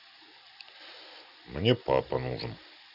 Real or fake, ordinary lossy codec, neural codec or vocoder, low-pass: real; none; none; 5.4 kHz